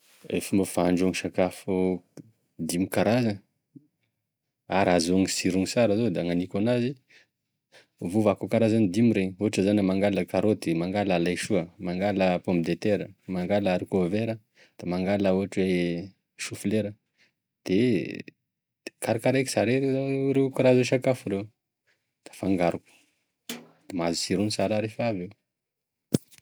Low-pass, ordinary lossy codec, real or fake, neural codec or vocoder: none; none; real; none